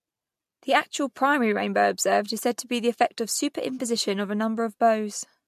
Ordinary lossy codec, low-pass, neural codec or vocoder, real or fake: MP3, 64 kbps; 14.4 kHz; none; real